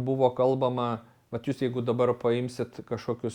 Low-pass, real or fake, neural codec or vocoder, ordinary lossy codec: 19.8 kHz; real; none; Opus, 64 kbps